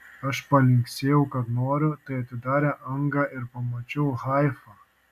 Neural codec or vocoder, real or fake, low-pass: none; real; 14.4 kHz